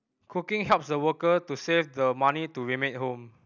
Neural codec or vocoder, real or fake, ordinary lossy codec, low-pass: none; real; none; 7.2 kHz